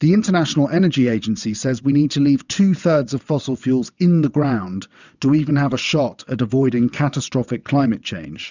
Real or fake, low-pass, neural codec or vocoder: fake; 7.2 kHz; vocoder, 22.05 kHz, 80 mel bands, WaveNeXt